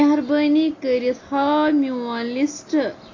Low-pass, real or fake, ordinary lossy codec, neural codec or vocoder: 7.2 kHz; real; AAC, 32 kbps; none